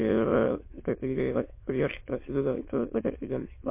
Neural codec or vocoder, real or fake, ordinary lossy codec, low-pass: autoencoder, 22.05 kHz, a latent of 192 numbers a frame, VITS, trained on many speakers; fake; MP3, 24 kbps; 3.6 kHz